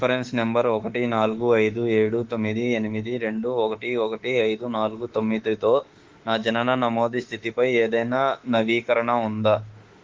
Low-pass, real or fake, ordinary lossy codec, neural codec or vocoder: 7.2 kHz; fake; Opus, 16 kbps; autoencoder, 48 kHz, 32 numbers a frame, DAC-VAE, trained on Japanese speech